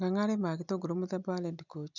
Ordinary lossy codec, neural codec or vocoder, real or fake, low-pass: none; none; real; 7.2 kHz